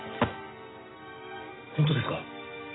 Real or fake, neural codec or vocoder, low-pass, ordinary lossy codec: real; none; 7.2 kHz; AAC, 16 kbps